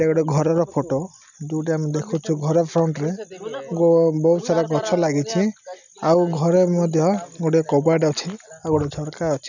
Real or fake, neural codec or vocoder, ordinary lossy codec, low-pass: real; none; none; 7.2 kHz